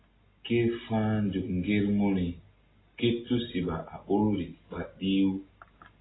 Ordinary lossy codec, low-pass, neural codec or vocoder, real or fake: AAC, 16 kbps; 7.2 kHz; none; real